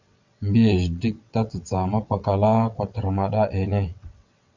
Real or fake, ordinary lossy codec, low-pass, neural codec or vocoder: fake; Opus, 64 kbps; 7.2 kHz; vocoder, 22.05 kHz, 80 mel bands, WaveNeXt